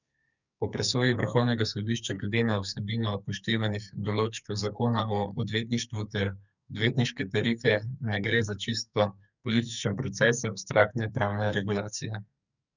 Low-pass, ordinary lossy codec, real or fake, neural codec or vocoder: 7.2 kHz; none; fake; codec, 44.1 kHz, 2.6 kbps, SNAC